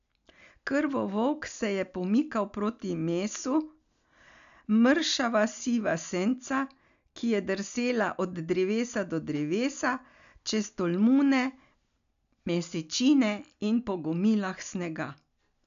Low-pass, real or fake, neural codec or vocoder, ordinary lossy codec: 7.2 kHz; real; none; none